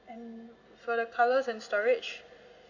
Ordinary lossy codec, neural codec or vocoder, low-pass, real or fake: none; none; 7.2 kHz; real